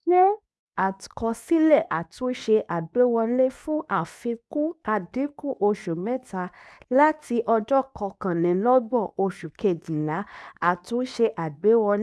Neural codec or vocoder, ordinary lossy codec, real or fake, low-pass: codec, 24 kHz, 0.9 kbps, WavTokenizer, medium speech release version 2; none; fake; none